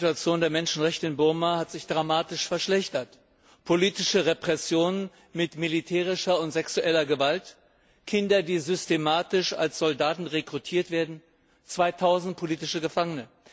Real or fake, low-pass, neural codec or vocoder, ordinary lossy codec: real; none; none; none